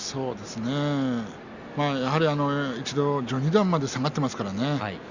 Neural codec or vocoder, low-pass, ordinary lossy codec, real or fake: none; 7.2 kHz; Opus, 64 kbps; real